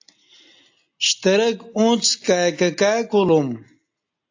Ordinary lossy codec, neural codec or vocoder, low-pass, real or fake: AAC, 48 kbps; none; 7.2 kHz; real